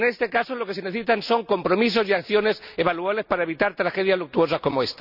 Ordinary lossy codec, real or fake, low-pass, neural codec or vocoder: none; real; 5.4 kHz; none